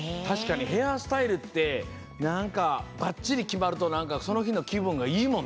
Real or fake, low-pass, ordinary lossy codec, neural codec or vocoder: real; none; none; none